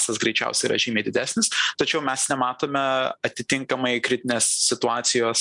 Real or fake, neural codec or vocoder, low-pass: real; none; 10.8 kHz